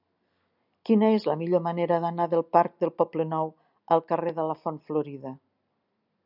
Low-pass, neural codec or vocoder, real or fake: 5.4 kHz; none; real